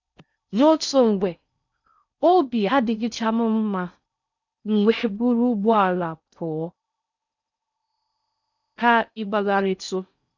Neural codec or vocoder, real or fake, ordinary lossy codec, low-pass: codec, 16 kHz in and 24 kHz out, 0.6 kbps, FocalCodec, streaming, 4096 codes; fake; none; 7.2 kHz